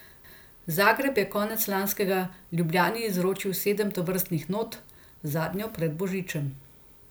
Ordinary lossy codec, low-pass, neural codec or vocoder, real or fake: none; none; none; real